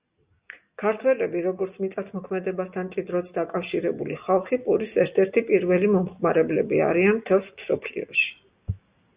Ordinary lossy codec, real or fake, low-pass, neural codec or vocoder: Opus, 64 kbps; real; 3.6 kHz; none